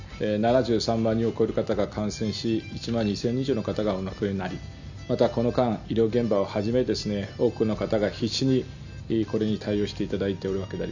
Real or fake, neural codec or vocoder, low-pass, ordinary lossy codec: real; none; 7.2 kHz; none